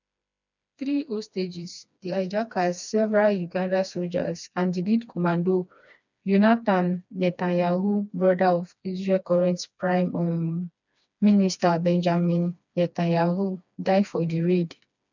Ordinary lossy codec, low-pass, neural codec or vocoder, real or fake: none; 7.2 kHz; codec, 16 kHz, 2 kbps, FreqCodec, smaller model; fake